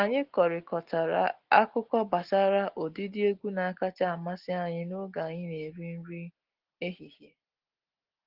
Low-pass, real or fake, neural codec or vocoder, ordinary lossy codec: 5.4 kHz; real; none; Opus, 16 kbps